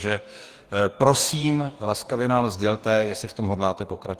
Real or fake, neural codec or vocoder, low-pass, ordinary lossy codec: fake; codec, 44.1 kHz, 2.6 kbps, DAC; 14.4 kHz; Opus, 24 kbps